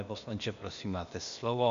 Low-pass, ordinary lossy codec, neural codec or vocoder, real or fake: 7.2 kHz; Opus, 64 kbps; codec, 16 kHz, 0.8 kbps, ZipCodec; fake